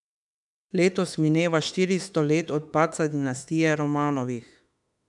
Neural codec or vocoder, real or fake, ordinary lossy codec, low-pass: autoencoder, 48 kHz, 32 numbers a frame, DAC-VAE, trained on Japanese speech; fake; none; 10.8 kHz